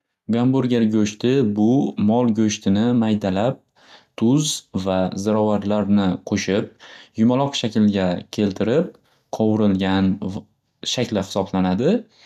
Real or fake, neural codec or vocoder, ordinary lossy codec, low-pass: real; none; none; 14.4 kHz